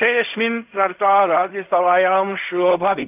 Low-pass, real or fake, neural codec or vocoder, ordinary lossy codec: 3.6 kHz; fake; codec, 16 kHz in and 24 kHz out, 0.4 kbps, LongCat-Audio-Codec, fine tuned four codebook decoder; none